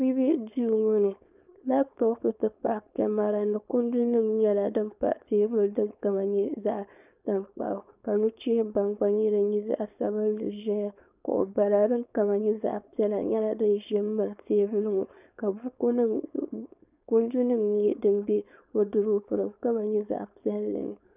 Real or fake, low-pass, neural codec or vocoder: fake; 3.6 kHz; codec, 16 kHz, 4.8 kbps, FACodec